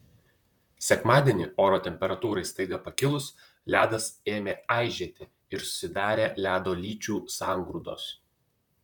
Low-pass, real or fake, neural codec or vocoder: 19.8 kHz; fake; vocoder, 44.1 kHz, 128 mel bands, Pupu-Vocoder